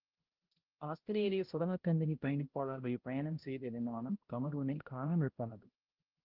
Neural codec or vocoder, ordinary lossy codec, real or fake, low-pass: codec, 16 kHz, 0.5 kbps, X-Codec, HuBERT features, trained on balanced general audio; Opus, 16 kbps; fake; 5.4 kHz